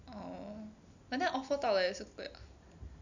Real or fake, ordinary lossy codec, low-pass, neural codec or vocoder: real; none; 7.2 kHz; none